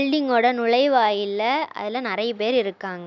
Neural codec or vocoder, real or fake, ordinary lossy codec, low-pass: none; real; none; 7.2 kHz